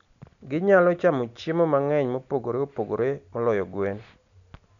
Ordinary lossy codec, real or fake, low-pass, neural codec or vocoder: none; real; 7.2 kHz; none